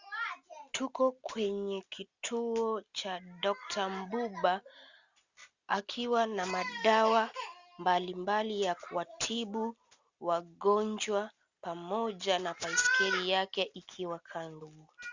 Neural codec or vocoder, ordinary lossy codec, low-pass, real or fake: none; Opus, 64 kbps; 7.2 kHz; real